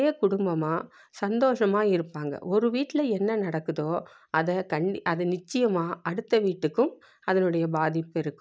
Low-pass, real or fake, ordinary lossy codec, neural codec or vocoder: none; real; none; none